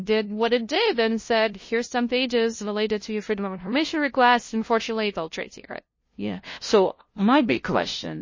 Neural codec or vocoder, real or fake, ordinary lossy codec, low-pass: codec, 16 kHz, 0.5 kbps, FunCodec, trained on LibriTTS, 25 frames a second; fake; MP3, 32 kbps; 7.2 kHz